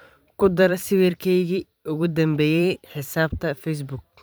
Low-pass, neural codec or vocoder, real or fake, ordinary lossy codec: none; vocoder, 44.1 kHz, 128 mel bands every 512 samples, BigVGAN v2; fake; none